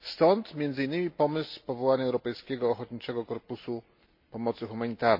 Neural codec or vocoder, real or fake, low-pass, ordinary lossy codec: none; real; 5.4 kHz; MP3, 32 kbps